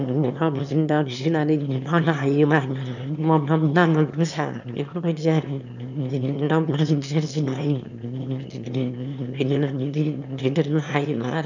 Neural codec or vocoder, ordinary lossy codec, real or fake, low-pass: autoencoder, 22.05 kHz, a latent of 192 numbers a frame, VITS, trained on one speaker; none; fake; 7.2 kHz